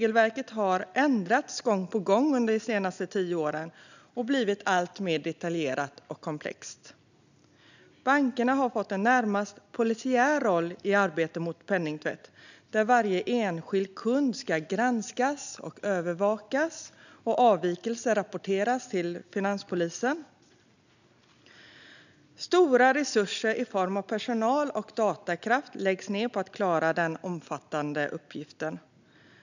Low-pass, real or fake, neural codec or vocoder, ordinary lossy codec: 7.2 kHz; real; none; none